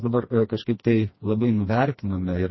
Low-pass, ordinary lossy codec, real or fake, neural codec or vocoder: 7.2 kHz; MP3, 24 kbps; fake; codec, 16 kHz, 2 kbps, FreqCodec, smaller model